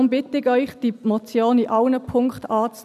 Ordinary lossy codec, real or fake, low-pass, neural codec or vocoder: none; real; 14.4 kHz; none